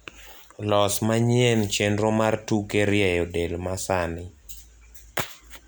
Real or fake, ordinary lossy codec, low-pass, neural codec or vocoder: real; none; none; none